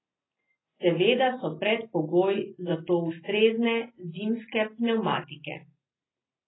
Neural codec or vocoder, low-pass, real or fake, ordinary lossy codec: none; 7.2 kHz; real; AAC, 16 kbps